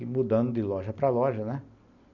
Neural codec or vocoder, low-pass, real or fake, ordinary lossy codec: none; 7.2 kHz; real; none